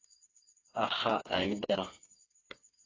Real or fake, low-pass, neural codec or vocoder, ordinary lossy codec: fake; 7.2 kHz; codec, 16 kHz, 4 kbps, FreqCodec, smaller model; AAC, 32 kbps